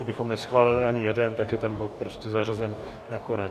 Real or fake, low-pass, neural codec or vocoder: fake; 14.4 kHz; codec, 44.1 kHz, 2.6 kbps, DAC